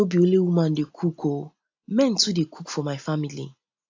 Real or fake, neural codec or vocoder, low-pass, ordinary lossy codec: real; none; 7.2 kHz; AAC, 48 kbps